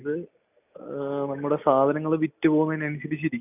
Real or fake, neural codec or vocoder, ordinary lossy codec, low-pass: real; none; none; 3.6 kHz